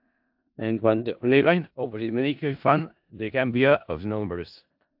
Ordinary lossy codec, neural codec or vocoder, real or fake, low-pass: AAC, 48 kbps; codec, 16 kHz in and 24 kHz out, 0.4 kbps, LongCat-Audio-Codec, four codebook decoder; fake; 5.4 kHz